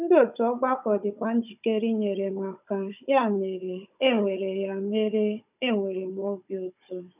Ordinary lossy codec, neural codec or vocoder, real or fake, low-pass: none; codec, 16 kHz, 16 kbps, FunCodec, trained on Chinese and English, 50 frames a second; fake; 3.6 kHz